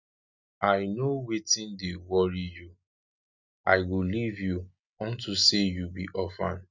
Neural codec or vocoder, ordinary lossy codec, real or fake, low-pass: none; none; real; 7.2 kHz